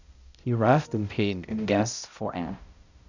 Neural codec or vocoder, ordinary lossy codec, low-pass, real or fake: codec, 16 kHz, 0.5 kbps, X-Codec, HuBERT features, trained on balanced general audio; none; 7.2 kHz; fake